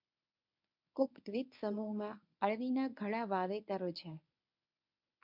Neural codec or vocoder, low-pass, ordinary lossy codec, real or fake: codec, 24 kHz, 0.9 kbps, WavTokenizer, medium speech release version 1; 5.4 kHz; MP3, 48 kbps; fake